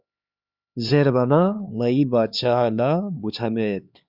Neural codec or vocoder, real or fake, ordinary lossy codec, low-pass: codec, 16 kHz, 2 kbps, X-Codec, HuBERT features, trained on LibriSpeech; fake; Opus, 64 kbps; 5.4 kHz